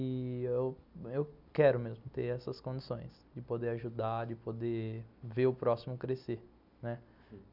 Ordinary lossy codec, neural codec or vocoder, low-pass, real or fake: none; none; 5.4 kHz; real